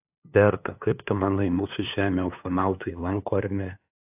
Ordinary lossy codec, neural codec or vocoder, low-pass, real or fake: AAC, 32 kbps; codec, 16 kHz, 2 kbps, FunCodec, trained on LibriTTS, 25 frames a second; 3.6 kHz; fake